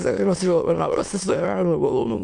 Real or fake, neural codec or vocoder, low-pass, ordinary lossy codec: fake; autoencoder, 22.05 kHz, a latent of 192 numbers a frame, VITS, trained on many speakers; 9.9 kHz; MP3, 64 kbps